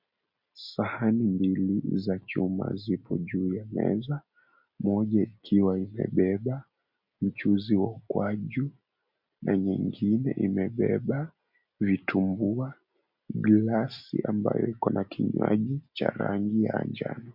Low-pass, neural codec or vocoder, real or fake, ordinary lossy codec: 5.4 kHz; none; real; MP3, 48 kbps